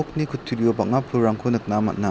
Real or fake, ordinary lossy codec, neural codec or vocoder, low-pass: real; none; none; none